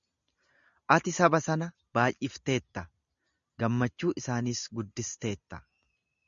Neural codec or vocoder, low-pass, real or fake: none; 7.2 kHz; real